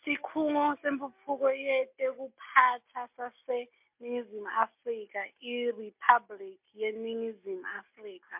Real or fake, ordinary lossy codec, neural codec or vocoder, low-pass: real; MP3, 32 kbps; none; 3.6 kHz